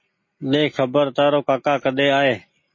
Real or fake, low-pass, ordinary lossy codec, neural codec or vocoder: real; 7.2 kHz; MP3, 32 kbps; none